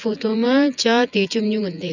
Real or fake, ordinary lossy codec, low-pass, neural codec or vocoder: fake; none; 7.2 kHz; vocoder, 24 kHz, 100 mel bands, Vocos